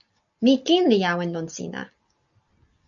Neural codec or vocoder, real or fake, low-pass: none; real; 7.2 kHz